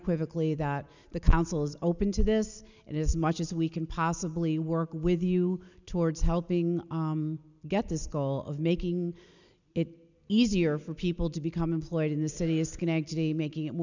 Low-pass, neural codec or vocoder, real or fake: 7.2 kHz; none; real